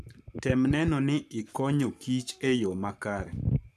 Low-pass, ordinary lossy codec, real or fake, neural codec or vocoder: 14.4 kHz; none; fake; codec, 44.1 kHz, 7.8 kbps, Pupu-Codec